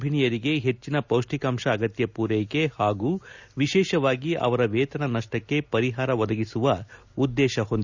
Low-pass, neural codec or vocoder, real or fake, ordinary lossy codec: 7.2 kHz; none; real; Opus, 64 kbps